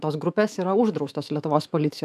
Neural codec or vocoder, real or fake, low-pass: autoencoder, 48 kHz, 128 numbers a frame, DAC-VAE, trained on Japanese speech; fake; 14.4 kHz